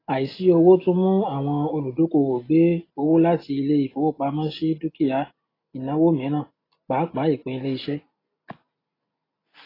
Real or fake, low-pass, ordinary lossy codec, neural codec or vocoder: real; 5.4 kHz; AAC, 24 kbps; none